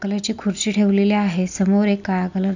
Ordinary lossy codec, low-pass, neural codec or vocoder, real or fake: none; 7.2 kHz; none; real